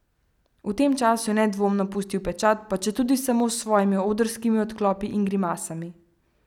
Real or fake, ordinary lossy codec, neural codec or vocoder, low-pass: real; none; none; 19.8 kHz